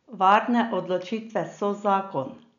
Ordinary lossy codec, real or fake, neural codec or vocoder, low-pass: none; real; none; 7.2 kHz